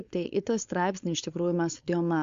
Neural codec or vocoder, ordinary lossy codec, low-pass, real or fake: codec, 16 kHz, 4.8 kbps, FACodec; Opus, 64 kbps; 7.2 kHz; fake